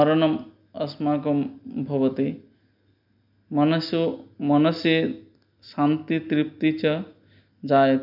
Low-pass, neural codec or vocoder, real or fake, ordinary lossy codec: 5.4 kHz; none; real; none